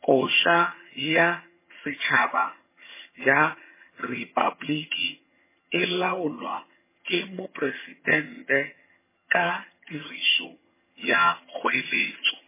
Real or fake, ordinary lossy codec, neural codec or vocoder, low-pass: fake; MP3, 16 kbps; vocoder, 22.05 kHz, 80 mel bands, HiFi-GAN; 3.6 kHz